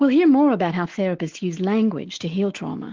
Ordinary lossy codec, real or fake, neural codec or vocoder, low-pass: Opus, 16 kbps; fake; autoencoder, 48 kHz, 128 numbers a frame, DAC-VAE, trained on Japanese speech; 7.2 kHz